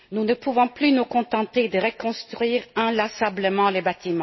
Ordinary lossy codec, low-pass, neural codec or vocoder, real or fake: MP3, 24 kbps; 7.2 kHz; none; real